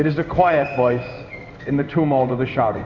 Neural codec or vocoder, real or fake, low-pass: codec, 16 kHz in and 24 kHz out, 1 kbps, XY-Tokenizer; fake; 7.2 kHz